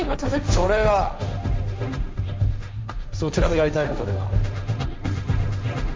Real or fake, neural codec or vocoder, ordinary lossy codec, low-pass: fake; codec, 16 kHz, 1.1 kbps, Voila-Tokenizer; none; none